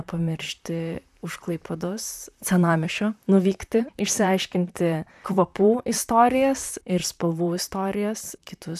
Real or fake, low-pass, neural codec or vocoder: fake; 14.4 kHz; vocoder, 44.1 kHz, 128 mel bands, Pupu-Vocoder